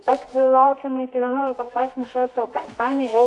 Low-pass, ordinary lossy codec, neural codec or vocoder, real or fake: 10.8 kHz; AAC, 48 kbps; codec, 24 kHz, 0.9 kbps, WavTokenizer, medium music audio release; fake